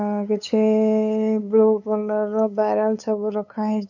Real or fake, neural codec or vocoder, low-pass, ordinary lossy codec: fake; codec, 16 kHz, 8 kbps, FunCodec, trained on LibriTTS, 25 frames a second; 7.2 kHz; none